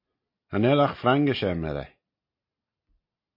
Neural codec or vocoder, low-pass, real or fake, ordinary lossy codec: none; 5.4 kHz; real; MP3, 32 kbps